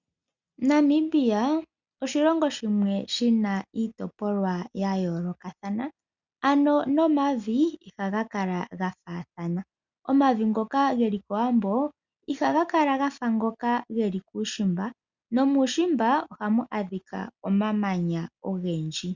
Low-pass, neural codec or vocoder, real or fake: 7.2 kHz; none; real